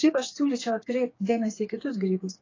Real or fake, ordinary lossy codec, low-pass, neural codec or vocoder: fake; AAC, 32 kbps; 7.2 kHz; codec, 16 kHz, 4 kbps, FreqCodec, smaller model